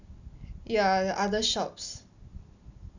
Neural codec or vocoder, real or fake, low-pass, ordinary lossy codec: none; real; 7.2 kHz; none